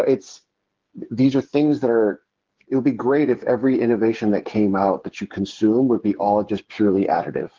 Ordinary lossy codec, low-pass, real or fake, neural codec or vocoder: Opus, 16 kbps; 7.2 kHz; fake; codec, 16 kHz, 8 kbps, FreqCodec, smaller model